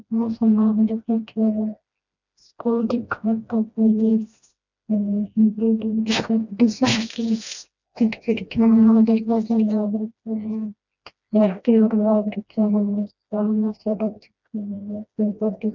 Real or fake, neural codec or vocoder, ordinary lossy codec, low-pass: fake; codec, 16 kHz, 1 kbps, FreqCodec, smaller model; none; 7.2 kHz